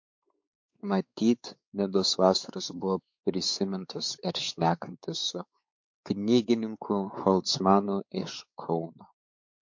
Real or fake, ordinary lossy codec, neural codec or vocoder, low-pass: fake; MP3, 48 kbps; codec, 16 kHz, 4 kbps, X-Codec, WavLM features, trained on Multilingual LibriSpeech; 7.2 kHz